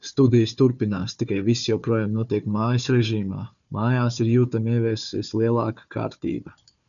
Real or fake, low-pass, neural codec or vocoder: fake; 7.2 kHz; codec, 16 kHz, 4 kbps, FunCodec, trained on Chinese and English, 50 frames a second